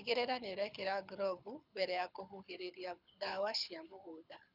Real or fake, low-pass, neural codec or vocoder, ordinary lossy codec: fake; 5.4 kHz; codec, 24 kHz, 6 kbps, HILCodec; none